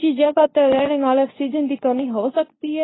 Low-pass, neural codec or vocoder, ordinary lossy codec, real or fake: 7.2 kHz; codec, 24 kHz, 0.9 kbps, WavTokenizer, medium speech release version 2; AAC, 16 kbps; fake